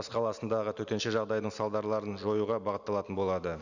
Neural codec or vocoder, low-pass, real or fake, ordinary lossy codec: none; 7.2 kHz; real; none